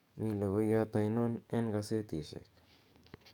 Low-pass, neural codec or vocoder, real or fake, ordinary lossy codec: 19.8 kHz; codec, 44.1 kHz, 7.8 kbps, DAC; fake; none